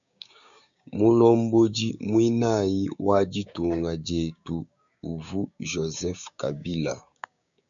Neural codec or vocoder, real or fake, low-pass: codec, 16 kHz, 6 kbps, DAC; fake; 7.2 kHz